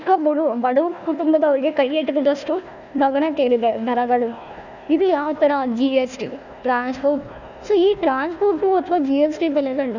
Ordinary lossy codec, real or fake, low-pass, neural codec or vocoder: none; fake; 7.2 kHz; codec, 16 kHz, 1 kbps, FunCodec, trained on Chinese and English, 50 frames a second